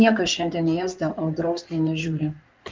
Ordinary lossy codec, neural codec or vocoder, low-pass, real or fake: Opus, 24 kbps; codec, 16 kHz in and 24 kHz out, 2.2 kbps, FireRedTTS-2 codec; 7.2 kHz; fake